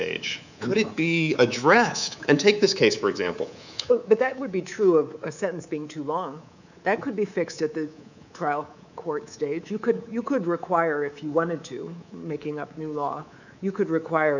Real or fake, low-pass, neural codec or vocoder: fake; 7.2 kHz; codec, 24 kHz, 3.1 kbps, DualCodec